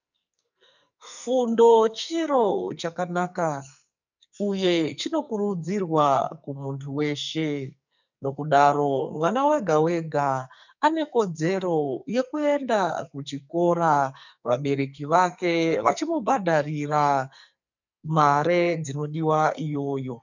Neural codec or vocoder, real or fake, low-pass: codec, 44.1 kHz, 2.6 kbps, SNAC; fake; 7.2 kHz